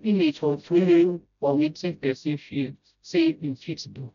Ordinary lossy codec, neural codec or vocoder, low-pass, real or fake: none; codec, 16 kHz, 0.5 kbps, FreqCodec, smaller model; 7.2 kHz; fake